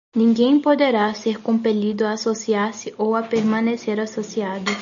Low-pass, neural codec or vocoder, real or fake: 7.2 kHz; none; real